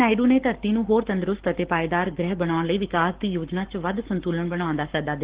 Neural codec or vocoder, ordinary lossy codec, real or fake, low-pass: codec, 44.1 kHz, 7.8 kbps, Pupu-Codec; Opus, 16 kbps; fake; 3.6 kHz